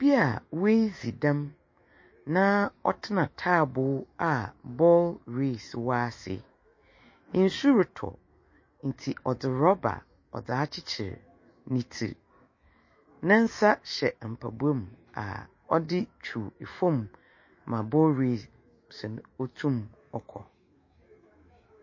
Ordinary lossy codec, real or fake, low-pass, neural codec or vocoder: MP3, 32 kbps; fake; 7.2 kHz; autoencoder, 48 kHz, 128 numbers a frame, DAC-VAE, trained on Japanese speech